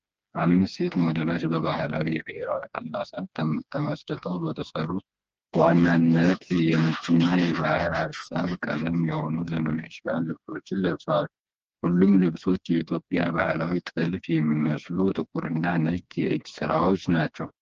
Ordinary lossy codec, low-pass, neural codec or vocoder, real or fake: Opus, 24 kbps; 7.2 kHz; codec, 16 kHz, 2 kbps, FreqCodec, smaller model; fake